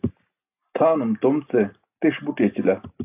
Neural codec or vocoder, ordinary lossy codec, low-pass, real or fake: none; AAC, 32 kbps; 3.6 kHz; real